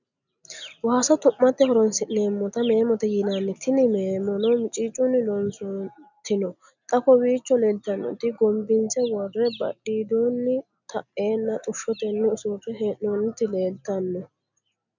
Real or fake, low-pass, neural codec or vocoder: real; 7.2 kHz; none